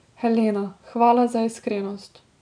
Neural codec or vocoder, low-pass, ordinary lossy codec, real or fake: none; 9.9 kHz; none; real